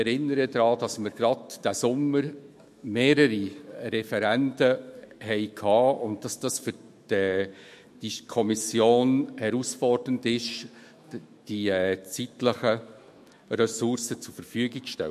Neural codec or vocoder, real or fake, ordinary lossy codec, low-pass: autoencoder, 48 kHz, 128 numbers a frame, DAC-VAE, trained on Japanese speech; fake; MP3, 64 kbps; 14.4 kHz